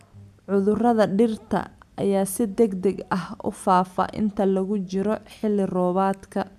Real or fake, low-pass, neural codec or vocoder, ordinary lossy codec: real; 14.4 kHz; none; none